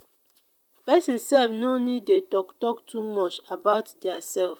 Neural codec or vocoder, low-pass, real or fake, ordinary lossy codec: vocoder, 44.1 kHz, 128 mel bands, Pupu-Vocoder; 19.8 kHz; fake; none